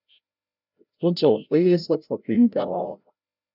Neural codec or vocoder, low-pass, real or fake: codec, 16 kHz, 0.5 kbps, FreqCodec, larger model; 5.4 kHz; fake